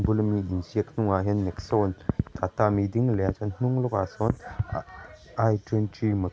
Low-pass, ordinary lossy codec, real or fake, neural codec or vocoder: none; none; real; none